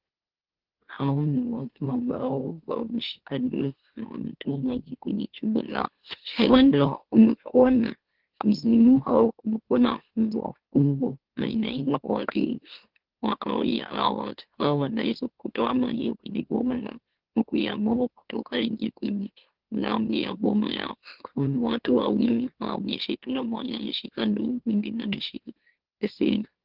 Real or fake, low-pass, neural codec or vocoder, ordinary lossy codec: fake; 5.4 kHz; autoencoder, 44.1 kHz, a latent of 192 numbers a frame, MeloTTS; Opus, 16 kbps